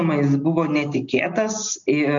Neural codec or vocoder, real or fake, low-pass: none; real; 7.2 kHz